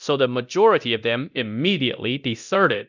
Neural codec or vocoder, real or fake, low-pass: codec, 24 kHz, 0.9 kbps, DualCodec; fake; 7.2 kHz